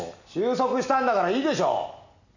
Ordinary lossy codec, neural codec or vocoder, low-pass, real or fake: none; none; 7.2 kHz; real